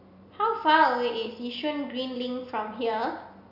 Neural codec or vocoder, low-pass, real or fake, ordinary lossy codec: none; 5.4 kHz; real; none